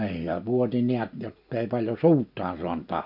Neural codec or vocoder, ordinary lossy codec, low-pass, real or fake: none; none; 5.4 kHz; real